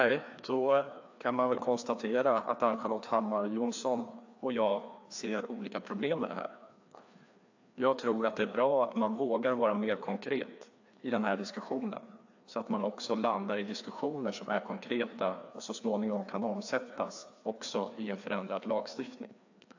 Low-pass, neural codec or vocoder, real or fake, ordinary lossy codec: 7.2 kHz; codec, 16 kHz, 2 kbps, FreqCodec, larger model; fake; AAC, 48 kbps